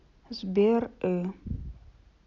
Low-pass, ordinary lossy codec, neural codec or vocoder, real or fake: 7.2 kHz; none; none; real